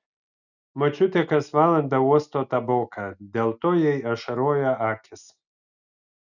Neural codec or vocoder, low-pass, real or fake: none; 7.2 kHz; real